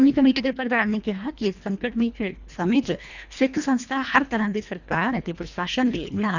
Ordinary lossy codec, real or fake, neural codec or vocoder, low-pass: none; fake; codec, 24 kHz, 1.5 kbps, HILCodec; 7.2 kHz